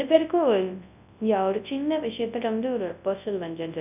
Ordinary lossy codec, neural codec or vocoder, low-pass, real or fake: none; codec, 24 kHz, 0.9 kbps, WavTokenizer, large speech release; 3.6 kHz; fake